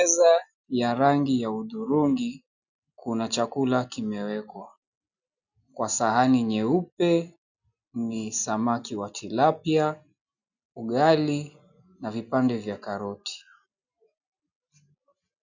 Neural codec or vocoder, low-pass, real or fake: none; 7.2 kHz; real